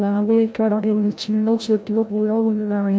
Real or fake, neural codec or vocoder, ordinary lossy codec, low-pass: fake; codec, 16 kHz, 0.5 kbps, FreqCodec, larger model; none; none